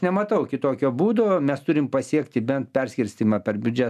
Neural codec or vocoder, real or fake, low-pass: none; real; 14.4 kHz